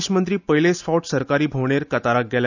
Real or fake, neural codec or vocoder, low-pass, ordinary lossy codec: real; none; 7.2 kHz; none